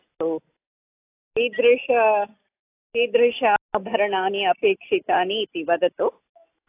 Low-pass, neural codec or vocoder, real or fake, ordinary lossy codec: 3.6 kHz; none; real; AAC, 24 kbps